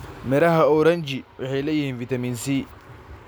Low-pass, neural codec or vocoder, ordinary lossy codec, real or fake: none; none; none; real